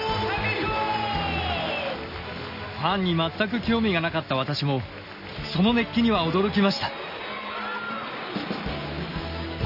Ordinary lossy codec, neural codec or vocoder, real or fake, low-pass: none; none; real; 5.4 kHz